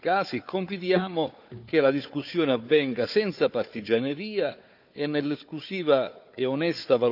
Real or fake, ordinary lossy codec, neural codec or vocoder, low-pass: fake; none; codec, 16 kHz, 4 kbps, FunCodec, trained on Chinese and English, 50 frames a second; 5.4 kHz